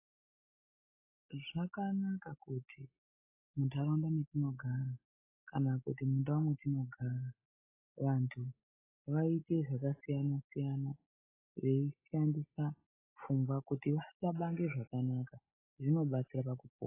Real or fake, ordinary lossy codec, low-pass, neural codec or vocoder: real; AAC, 16 kbps; 3.6 kHz; none